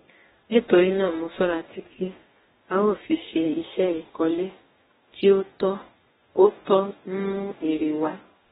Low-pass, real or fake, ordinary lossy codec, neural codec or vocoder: 19.8 kHz; fake; AAC, 16 kbps; codec, 44.1 kHz, 2.6 kbps, DAC